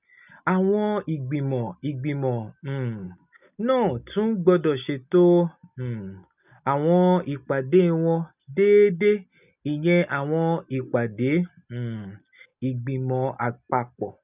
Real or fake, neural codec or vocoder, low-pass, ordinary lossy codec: real; none; 3.6 kHz; none